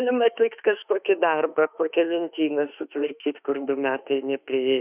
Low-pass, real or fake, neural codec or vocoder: 3.6 kHz; fake; autoencoder, 48 kHz, 32 numbers a frame, DAC-VAE, trained on Japanese speech